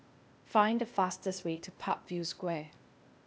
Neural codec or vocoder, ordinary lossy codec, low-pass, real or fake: codec, 16 kHz, 0.8 kbps, ZipCodec; none; none; fake